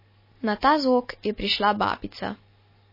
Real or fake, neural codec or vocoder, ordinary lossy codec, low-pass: real; none; MP3, 24 kbps; 5.4 kHz